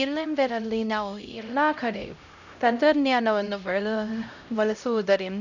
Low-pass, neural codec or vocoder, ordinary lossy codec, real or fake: 7.2 kHz; codec, 16 kHz, 0.5 kbps, X-Codec, HuBERT features, trained on LibriSpeech; none; fake